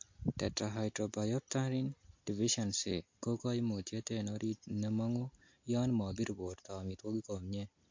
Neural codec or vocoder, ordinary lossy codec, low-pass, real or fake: none; MP3, 48 kbps; 7.2 kHz; real